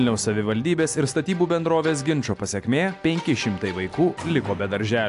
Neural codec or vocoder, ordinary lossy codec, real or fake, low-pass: none; AAC, 64 kbps; real; 10.8 kHz